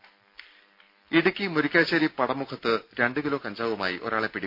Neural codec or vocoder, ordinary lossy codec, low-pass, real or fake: none; MP3, 48 kbps; 5.4 kHz; real